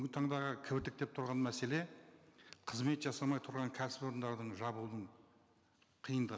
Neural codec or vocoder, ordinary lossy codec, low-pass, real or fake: none; none; none; real